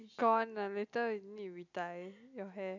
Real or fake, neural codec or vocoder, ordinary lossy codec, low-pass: real; none; none; 7.2 kHz